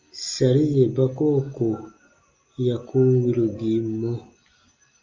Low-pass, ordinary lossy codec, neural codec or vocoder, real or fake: 7.2 kHz; Opus, 32 kbps; none; real